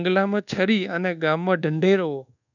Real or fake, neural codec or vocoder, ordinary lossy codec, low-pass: fake; codec, 24 kHz, 1.2 kbps, DualCodec; none; 7.2 kHz